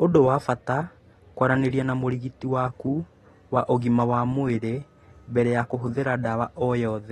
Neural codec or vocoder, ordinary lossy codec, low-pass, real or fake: none; AAC, 32 kbps; 19.8 kHz; real